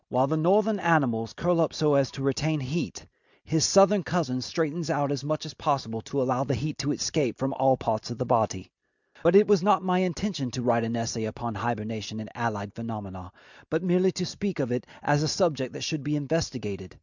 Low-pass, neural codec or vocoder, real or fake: 7.2 kHz; none; real